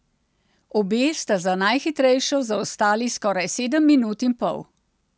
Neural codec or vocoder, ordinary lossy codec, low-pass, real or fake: none; none; none; real